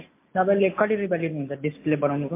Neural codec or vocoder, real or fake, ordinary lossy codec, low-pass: none; real; MP3, 24 kbps; 3.6 kHz